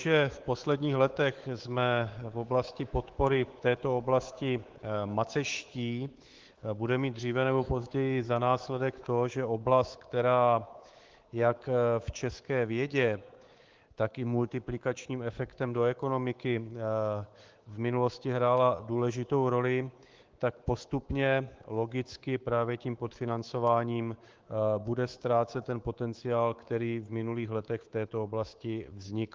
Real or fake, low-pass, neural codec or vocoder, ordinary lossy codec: fake; 7.2 kHz; codec, 24 kHz, 3.1 kbps, DualCodec; Opus, 16 kbps